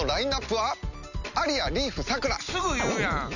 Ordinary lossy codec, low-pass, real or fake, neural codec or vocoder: none; 7.2 kHz; real; none